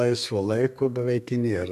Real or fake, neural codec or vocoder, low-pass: fake; codec, 32 kHz, 1.9 kbps, SNAC; 14.4 kHz